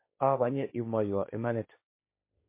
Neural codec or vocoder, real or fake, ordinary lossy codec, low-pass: codec, 16 kHz, 1.1 kbps, Voila-Tokenizer; fake; MP3, 24 kbps; 3.6 kHz